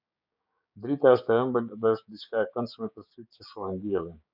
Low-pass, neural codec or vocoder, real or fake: 5.4 kHz; codec, 16 kHz, 6 kbps, DAC; fake